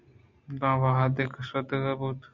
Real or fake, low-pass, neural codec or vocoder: real; 7.2 kHz; none